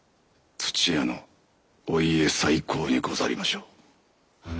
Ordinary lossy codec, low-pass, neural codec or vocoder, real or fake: none; none; none; real